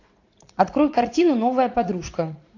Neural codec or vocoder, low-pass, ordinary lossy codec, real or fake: vocoder, 44.1 kHz, 80 mel bands, Vocos; 7.2 kHz; AAC, 48 kbps; fake